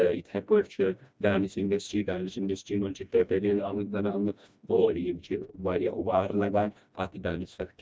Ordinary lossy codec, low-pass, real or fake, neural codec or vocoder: none; none; fake; codec, 16 kHz, 1 kbps, FreqCodec, smaller model